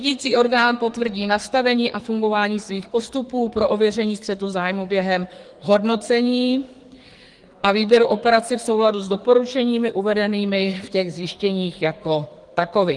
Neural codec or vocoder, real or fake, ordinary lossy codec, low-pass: codec, 44.1 kHz, 2.6 kbps, SNAC; fake; Opus, 24 kbps; 10.8 kHz